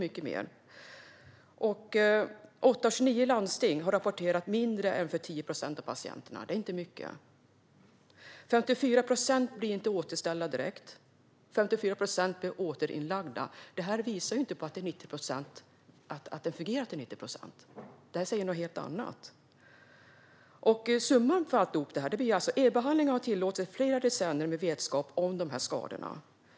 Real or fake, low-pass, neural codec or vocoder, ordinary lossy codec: real; none; none; none